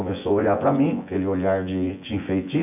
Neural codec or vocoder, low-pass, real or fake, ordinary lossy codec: vocoder, 24 kHz, 100 mel bands, Vocos; 3.6 kHz; fake; none